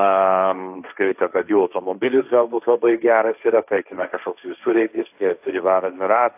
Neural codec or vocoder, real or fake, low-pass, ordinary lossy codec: codec, 16 kHz, 1.1 kbps, Voila-Tokenizer; fake; 3.6 kHz; AAC, 32 kbps